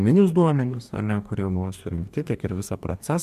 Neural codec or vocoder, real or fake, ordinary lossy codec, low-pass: codec, 44.1 kHz, 2.6 kbps, DAC; fake; MP3, 96 kbps; 14.4 kHz